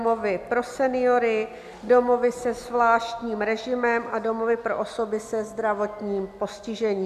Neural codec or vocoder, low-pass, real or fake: none; 14.4 kHz; real